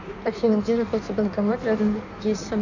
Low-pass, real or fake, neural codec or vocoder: 7.2 kHz; fake; codec, 16 kHz in and 24 kHz out, 1.1 kbps, FireRedTTS-2 codec